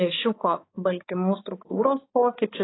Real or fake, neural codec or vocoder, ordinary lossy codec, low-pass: fake; codec, 16 kHz, 4 kbps, X-Codec, HuBERT features, trained on balanced general audio; AAC, 16 kbps; 7.2 kHz